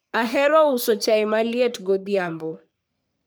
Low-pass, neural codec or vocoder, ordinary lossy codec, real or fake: none; codec, 44.1 kHz, 3.4 kbps, Pupu-Codec; none; fake